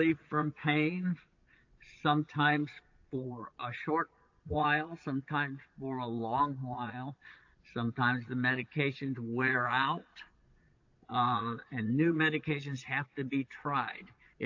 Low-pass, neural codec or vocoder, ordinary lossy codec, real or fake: 7.2 kHz; vocoder, 22.05 kHz, 80 mel bands, Vocos; AAC, 48 kbps; fake